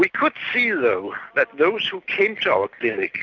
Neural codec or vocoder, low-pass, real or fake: none; 7.2 kHz; real